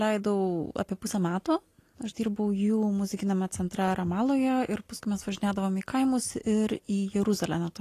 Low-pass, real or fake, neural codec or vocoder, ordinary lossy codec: 14.4 kHz; real; none; AAC, 48 kbps